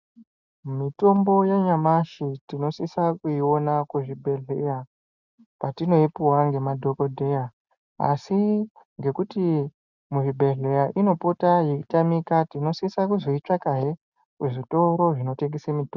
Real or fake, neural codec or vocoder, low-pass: real; none; 7.2 kHz